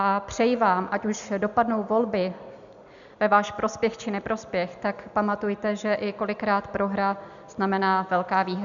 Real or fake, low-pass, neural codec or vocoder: real; 7.2 kHz; none